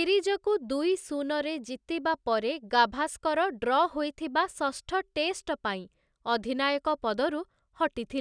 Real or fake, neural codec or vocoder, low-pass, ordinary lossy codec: real; none; 9.9 kHz; none